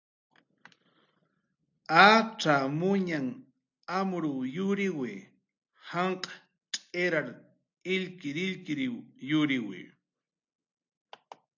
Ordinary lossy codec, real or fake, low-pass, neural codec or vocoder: AAC, 48 kbps; real; 7.2 kHz; none